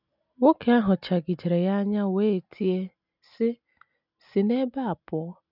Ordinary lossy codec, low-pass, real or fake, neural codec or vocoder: none; 5.4 kHz; real; none